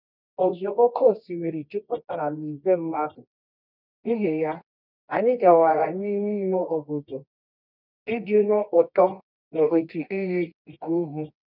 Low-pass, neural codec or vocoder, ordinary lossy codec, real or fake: 5.4 kHz; codec, 24 kHz, 0.9 kbps, WavTokenizer, medium music audio release; none; fake